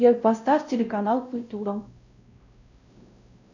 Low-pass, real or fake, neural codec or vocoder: 7.2 kHz; fake; codec, 16 kHz, 0.5 kbps, X-Codec, WavLM features, trained on Multilingual LibriSpeech